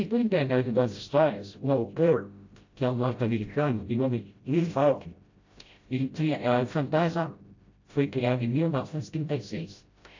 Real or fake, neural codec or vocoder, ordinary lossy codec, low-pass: fake; codec, 16 kHz, 0.5 kbps, FreqCodec, smaller model; AAC, 48 kbps; 7.2 kHz